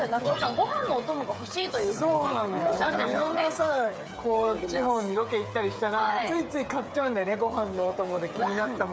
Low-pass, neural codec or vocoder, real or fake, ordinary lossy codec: none; codec, 16 kHz, 8 kbps, FreqCodec, smaller model; fake; none